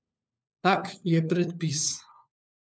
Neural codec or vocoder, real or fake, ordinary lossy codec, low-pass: codec, 16 kHz, 4 kbps, FunCodec, trained on LibriTTS, 50 frames a second; fake; none; none